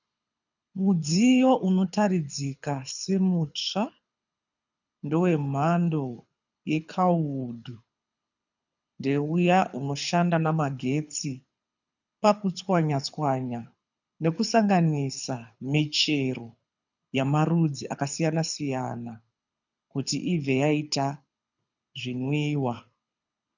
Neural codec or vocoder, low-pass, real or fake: codec, 24 kHz, 6 kbps, HILCodec; 7.2 kHz; fake